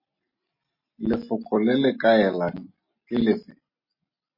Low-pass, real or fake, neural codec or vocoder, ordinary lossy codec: 5.4 kHz; real; none; MP3, 24 kbps